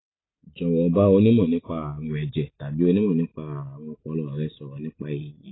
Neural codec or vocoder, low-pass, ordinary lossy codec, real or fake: none; 7.2 kHz; AAC, 16 kbps; real